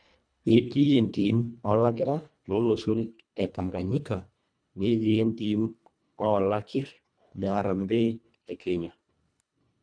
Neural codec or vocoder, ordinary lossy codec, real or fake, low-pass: codec, 24 kHz, 1.5 kbps, HILCodec; none; fake; 9.9 kHz